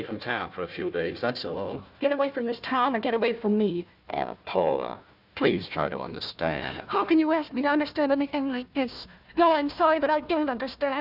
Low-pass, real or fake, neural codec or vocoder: 5.4 kHz; fake; codec, 16 kHz, 1 kbps, FunCodec, trained on Chinese and English, 50 frames a second